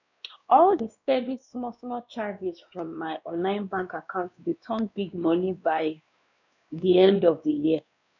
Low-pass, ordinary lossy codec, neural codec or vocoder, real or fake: 7.2 kHz; none; codec, 16 kHz, 2 kbps, X-Codec, WavLM features, trained on Multilingual LibriSpeech; fake